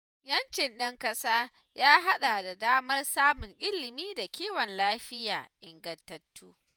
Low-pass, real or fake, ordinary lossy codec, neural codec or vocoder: none; fake; none; vocoder, 48 kHz, 128 mel bands, Vocos